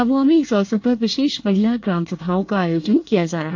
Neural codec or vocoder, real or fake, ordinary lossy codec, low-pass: codec, 24 kHz, 1 kbps, SNAC; fake; AAC, 48 kbps; 7.2 kHz